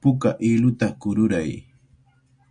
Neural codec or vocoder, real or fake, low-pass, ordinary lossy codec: none; real; 9.9 kHz; MP3, 96 kbps